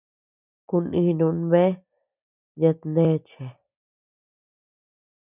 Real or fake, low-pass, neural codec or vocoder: real; 3.6 kHz; none